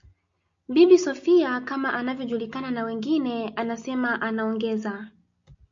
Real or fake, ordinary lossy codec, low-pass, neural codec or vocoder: real; AAC, 64 kbps; 7.2 kHz; none